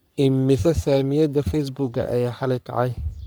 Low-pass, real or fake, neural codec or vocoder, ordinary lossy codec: none; fake; codec, 44.1 kHz, 3.4 kbps, Pupu-Codec; none